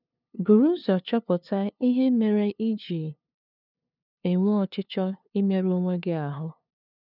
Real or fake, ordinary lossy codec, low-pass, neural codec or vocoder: fake; none; 5.4 kHz; codec, 16 kHz, 2 kbps, FunCodec, trained on LibriTTS, 25 frames a second